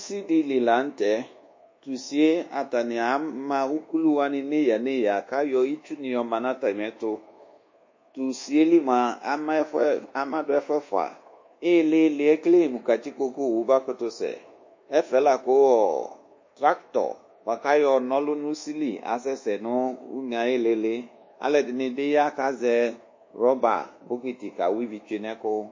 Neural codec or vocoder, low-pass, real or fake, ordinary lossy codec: codec, 24 kHz, 1.2 kbps, DualCodec; 7.2 kHz; fake; MP3, 32 kbps